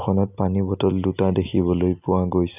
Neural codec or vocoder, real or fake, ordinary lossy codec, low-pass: none; real; none; 3.6 kHz